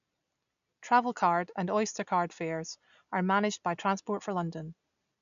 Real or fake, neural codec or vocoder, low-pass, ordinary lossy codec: real; none; 7.2 kHz; none